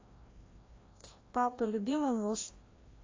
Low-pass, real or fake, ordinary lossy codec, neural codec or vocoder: 7.2 kHz; fake; AAC, 32 kbps; codec, 16 kHz, 1 kbps, FreqCodec, larger model